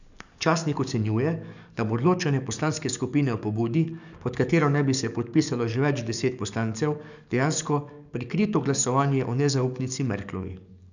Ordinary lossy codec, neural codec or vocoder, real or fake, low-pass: none; codec, 44.1 kHz, 7.8 kbps, DAC; fake; 7.2 kHz